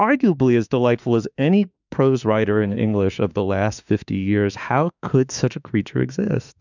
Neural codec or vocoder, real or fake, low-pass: autoencoder, 48 kHz, 32 numbers a frame, DAC-VAE, trained on Japanese speech; fake; 7.2 kHz